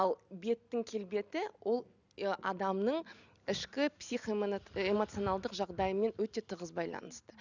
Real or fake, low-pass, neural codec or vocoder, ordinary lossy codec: real; 7.2 kHz; none; none